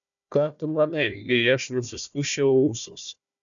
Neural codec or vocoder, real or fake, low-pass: codec, 16 kHz, 1 kbps, FunCodec, trained on Chinese and English, 50 frames a second; fake; 7.2 kHz